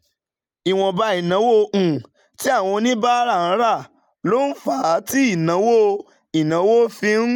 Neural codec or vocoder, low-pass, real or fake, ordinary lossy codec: none; 19.8 kHz; real; none